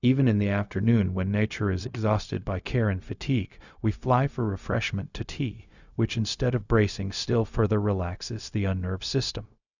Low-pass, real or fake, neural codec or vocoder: 7.2 kHz; fake; codec, 16 kHz, 0.4 kbps, LongCat-Audio-Codec